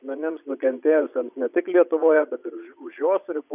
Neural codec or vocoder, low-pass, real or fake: vocoder, 22.05 kHz, 80 mel bands, Vocos; 3.6 kHz; fake